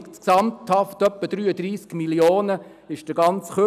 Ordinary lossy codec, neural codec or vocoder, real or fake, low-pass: none; none; real; 14.4 kHz